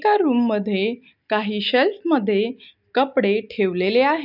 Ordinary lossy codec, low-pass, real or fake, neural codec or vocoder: none; 5.4 kHz; real; none